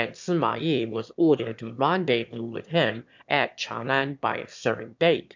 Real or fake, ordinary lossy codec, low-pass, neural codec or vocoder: fake; MP3, 64 kbps; 7.2 kHz; autoencoder, 22.05 kHz, a latent of 192 numbers a frame, VITS, trained on one speaker